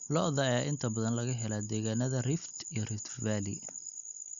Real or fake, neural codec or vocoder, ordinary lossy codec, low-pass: real; none; Opus, 64 kbps; 7.2 kHz